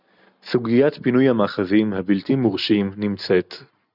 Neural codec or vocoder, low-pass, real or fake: none; 5.4 kHz; real